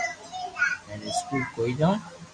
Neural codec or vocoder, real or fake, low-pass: none; real; 9.9 kHz